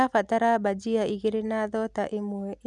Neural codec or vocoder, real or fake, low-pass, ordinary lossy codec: none; real; none; none